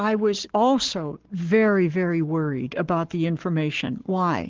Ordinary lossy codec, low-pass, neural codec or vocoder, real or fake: Opus, 16 kbps; 7.2 kHz; codec, 44.1 kHz, 7.8 kbps, Pupu-Codec; fake